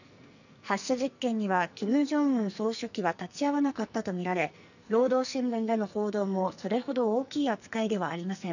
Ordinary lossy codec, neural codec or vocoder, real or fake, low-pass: none; codec, 44.1 kHz, 2.6 kbps, SNAC; fake; 7.2 kHz